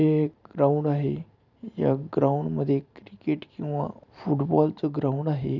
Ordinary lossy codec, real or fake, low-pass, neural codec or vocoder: none; fake; 7.2 kHz; vocoder, 44.1 kHz, 128 mel bands every 512 samples, BigVGAN v2